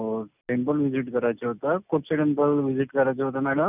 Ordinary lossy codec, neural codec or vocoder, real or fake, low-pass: none; none; real; 3.6 kHz